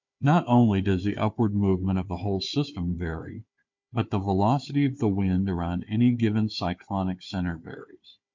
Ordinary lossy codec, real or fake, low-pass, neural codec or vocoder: MP3, 48 kbps; fake; 7.2 kHz; codec, 16 kHz, 4 kbps, FunCodec, trained on Chinese and English, 50 frames a second